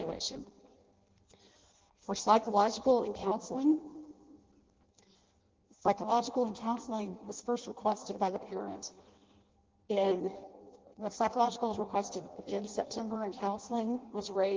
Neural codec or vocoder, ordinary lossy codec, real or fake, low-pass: codec, 16 kHz in and 24 kHz out, 0.6 kbps, FireRedTTS-2 codec; Opus, 16 kbps; fake; 7.2 kHz